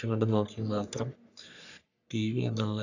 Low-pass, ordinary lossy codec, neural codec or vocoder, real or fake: 7.2 kHz; none; codec, 44.1 kHz, 3.4 kbps, Pupu-Codec; fake